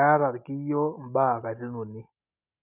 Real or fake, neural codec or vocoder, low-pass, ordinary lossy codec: real; none; 3.6 kHz; none